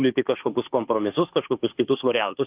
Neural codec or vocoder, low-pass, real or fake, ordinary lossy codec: autoencoder, 48 kHz, 32 numbers a frame, DAC-VAE, trained on Japanese speech; 3.6 kHz; fake; Opus, 32 kbps